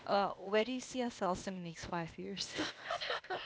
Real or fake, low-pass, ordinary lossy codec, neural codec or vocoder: fake; none; none; codec, 16 kHz, 0.8 kbps, ZipCodec